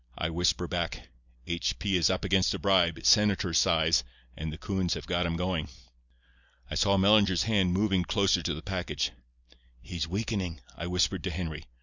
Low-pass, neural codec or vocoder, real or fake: 7.2 kHz; none; real